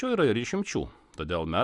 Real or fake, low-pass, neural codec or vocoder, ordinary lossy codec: real; 10.8 kHz; none; Opus, 64 kbps